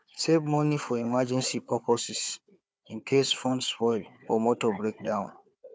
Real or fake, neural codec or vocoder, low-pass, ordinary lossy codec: fake; codec, 16 kHz, 4 kbps, FunCodec, trained on Chinese and English, 50 frames a second; none; none